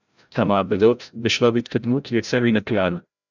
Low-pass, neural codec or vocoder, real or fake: 7.2 kHz; codec, 16 kHz, 0.5 kbps, FreqCodec, larger model; fake